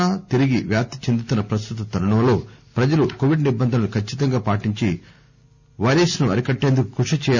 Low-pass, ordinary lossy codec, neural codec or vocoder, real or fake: 7.2 kHz; none; none; real